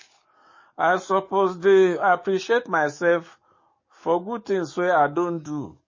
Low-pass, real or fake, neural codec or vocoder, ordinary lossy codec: 7.2 kHz; fake; codec, 44.1 kHz, 7.8 kbps, DAC; MP3, 32 kbps